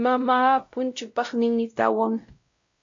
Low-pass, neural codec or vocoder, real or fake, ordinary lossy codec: 7.2 kHz; codec, 16 kHz, 0.5 kbps, X-Codec, WavLM features, trained on Multilingual LibriSpeech; fake; MP3, 48 kbps